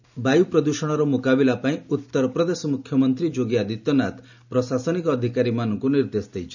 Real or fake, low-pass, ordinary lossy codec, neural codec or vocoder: real; 7.2 kHz; none; none